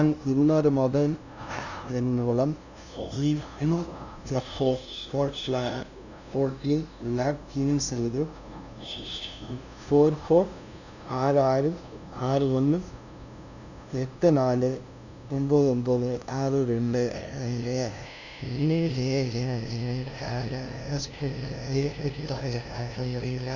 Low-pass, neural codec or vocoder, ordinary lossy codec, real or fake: 7.2 kHz; codec, 16 kHz, 0.5 kbps, FunCodec, trained on LibriTTS, 25 frames a second; none; fake